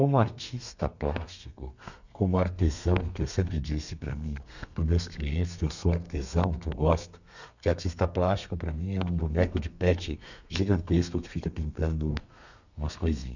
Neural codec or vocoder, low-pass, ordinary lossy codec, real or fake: codec, 32 kHz, 1.9 kbps, SNAC; 7.2 kHz; none; fake